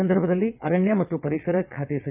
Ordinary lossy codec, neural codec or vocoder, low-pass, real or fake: none; vocoder, 22.05 kHz, 80 mel bands, WaveNeXt; 3.6 kHz; fake